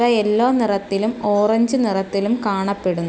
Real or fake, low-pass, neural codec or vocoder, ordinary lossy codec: real; none; none; none